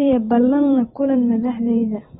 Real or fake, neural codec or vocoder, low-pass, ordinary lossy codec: fake; vocoder, 44.1 kHz, 128 mel bands every 512 samples, BigVGAN v2; 19.8 kHz; AAC, 16 kbps